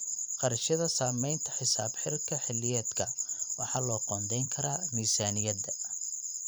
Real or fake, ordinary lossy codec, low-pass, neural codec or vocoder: fake; none; none; vocoder, 44.1 kHz, 128 mel bands every 512 samples, BigVGAN v2